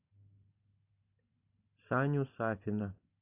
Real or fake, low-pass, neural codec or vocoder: real; 3.6 kHz; none